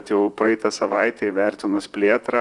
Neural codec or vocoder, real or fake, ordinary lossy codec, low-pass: vocoder, 44.1 kHz, 128 mel bands, Pupu-Vocoder; fake; Opus, 64 kbps; 10.8 kHz